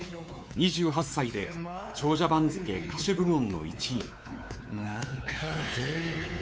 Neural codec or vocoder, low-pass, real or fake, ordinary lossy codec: codec, 16 kHz, 4 kbps, X-Codec, WavLM features, trained on Multilingual LibriSpeech; none; fake; none